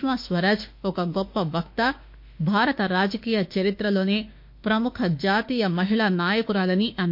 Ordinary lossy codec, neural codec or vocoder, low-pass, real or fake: MP3, 32 kbps; autoencoder, 48 kHz, 32 numbers a frame, DAC-VAE, trained on Japanese speech; 5.4 kHz; fake